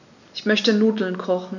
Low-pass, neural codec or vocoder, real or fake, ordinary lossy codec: 7.2 kHz; none; real; none